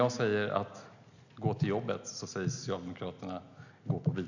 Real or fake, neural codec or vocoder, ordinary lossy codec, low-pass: real; none; none; 7.2 kHz